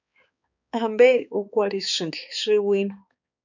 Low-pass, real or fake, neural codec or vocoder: 7.2 kHz; fake; codec, 16 kHz, 4 kbps, X-Codec, HuBERT features, trained on balanced general audio